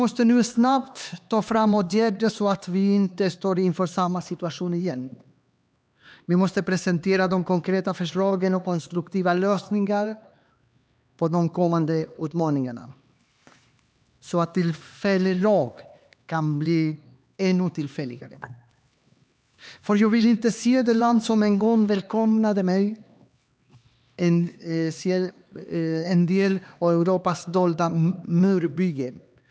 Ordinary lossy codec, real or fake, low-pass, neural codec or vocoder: none; fake; none; codec, 16 kHz, 2 kbps, X-Codec, HuBERT features, trained on LibriSpeech